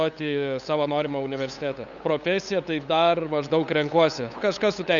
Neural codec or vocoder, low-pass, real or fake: codec, 16 kHz, 8 kbps, FunCodec, trained on LibriTTS, 25 frames a second; 7.2 kHz; fake